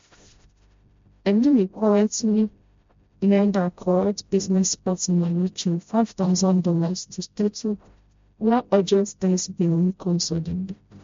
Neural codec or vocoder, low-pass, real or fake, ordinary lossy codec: codec, 16 kHz, 0.5 kbps, FreqCodec, smaller model; 7.2 kHz; fake; MP3, 48 kbps